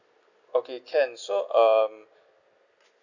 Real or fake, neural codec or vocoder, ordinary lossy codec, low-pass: real; none; none; 7.2 kHz